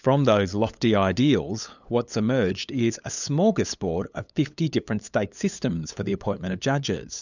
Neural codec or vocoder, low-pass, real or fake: codec, 16 kHz, 8 kbps, FunCodec, trained on LibriTTS, 25 frames a second; 7.2 kHz; fake